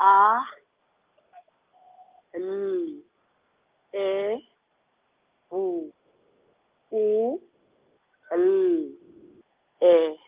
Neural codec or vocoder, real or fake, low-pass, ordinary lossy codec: none; real; 3.6 kHz; Opus, 32 kbps